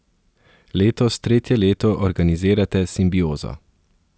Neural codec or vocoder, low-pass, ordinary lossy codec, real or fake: none; none; none; real